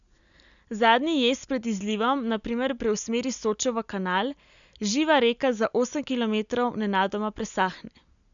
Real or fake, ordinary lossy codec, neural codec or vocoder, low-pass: real; none; none; 7.2 kHz